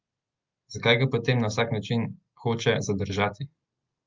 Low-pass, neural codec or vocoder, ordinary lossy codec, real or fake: 7.2 kHz; none; Opus, 24 kbps; real